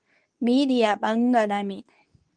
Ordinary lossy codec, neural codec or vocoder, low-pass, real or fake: Opus, 32 kbps; codec, 24 kHz, 0.9 kbps, WavTokenizer, medium speech release version 2; 9.9 kHz; fake